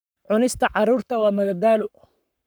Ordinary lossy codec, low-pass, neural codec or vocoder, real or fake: none; none; codec, 44.1 kHz, 7.8 kbps, Pupu-Codec; fake